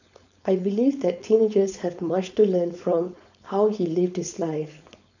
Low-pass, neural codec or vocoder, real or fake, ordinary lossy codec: 7.2 kHz; codec, 16 kHz, 4.8 kbps, FACodec; fake; none